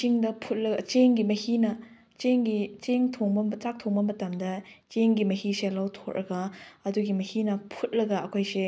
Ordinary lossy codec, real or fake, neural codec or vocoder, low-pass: none; real; none; none